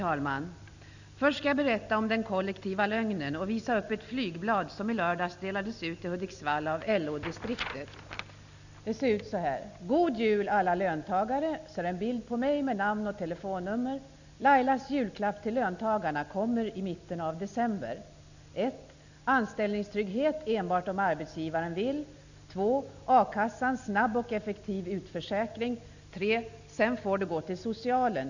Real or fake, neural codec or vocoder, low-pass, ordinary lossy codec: real; none; 7.2 kHz; none